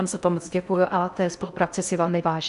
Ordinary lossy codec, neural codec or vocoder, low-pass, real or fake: MP3, 96 kbps; codec, 16 kHz in and 24 kHz out, 0.6 kbps, FocalCodec, streaming, 4096 codes; 10.8 kHz; fake